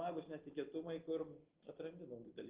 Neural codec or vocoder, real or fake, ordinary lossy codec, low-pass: none; real; Opus, 16 kbps; 3.6 kHz